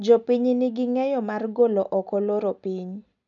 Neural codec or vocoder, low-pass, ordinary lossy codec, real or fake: none; 7.2 kHz; none; real